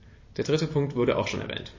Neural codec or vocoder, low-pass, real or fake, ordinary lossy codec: vocoder, 44.1 kHz, 128 mel bands every 256 samples, BigVGAN v2; 7.2 kHz; fake; MP3, 32 kbps